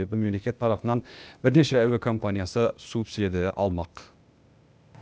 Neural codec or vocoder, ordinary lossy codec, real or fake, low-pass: codec, 16 kHz, 0.8 kbps, ZipCodec; none; fake; none